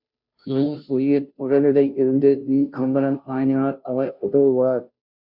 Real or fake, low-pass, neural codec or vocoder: fake; 5.4 kHz; codec, 16 kHz, 0.5 kbps, FunCodec, trained on Chinese and English, 25 frames a second